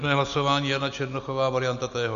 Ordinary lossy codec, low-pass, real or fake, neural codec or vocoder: MP3, 96 kbps; 7.2 kHz; real; none